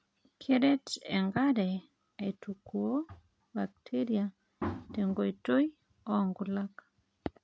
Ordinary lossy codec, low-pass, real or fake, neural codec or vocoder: none; none; real; none